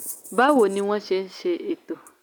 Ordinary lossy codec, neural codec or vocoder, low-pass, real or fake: none; none; none; real